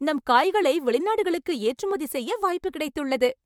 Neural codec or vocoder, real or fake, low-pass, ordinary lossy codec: vocoder, 44.1 kHz, 128 mel bands, Pupu-Vocoder; fake; 19.8 kHz; MP3, 96 kbps